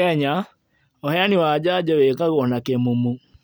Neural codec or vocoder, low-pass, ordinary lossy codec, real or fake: none; none; none; real